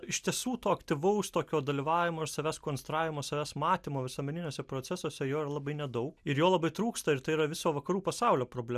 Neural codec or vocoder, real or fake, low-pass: none; real; 14.4 kHz